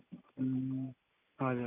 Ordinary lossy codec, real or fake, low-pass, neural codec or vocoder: none; real; 3.6 kHz; none